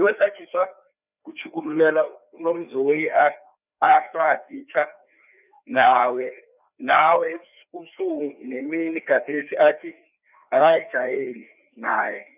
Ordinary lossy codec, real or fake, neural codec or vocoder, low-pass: none; fake; codec, 16 kHz, 2 kbps, FreqCodec, larger model; 3.6 kHz